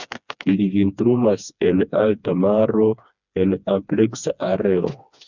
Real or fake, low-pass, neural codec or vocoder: fake; 7.2 kHz; codec, 16 kHz, 2 kbps, FreqCodec, smaller model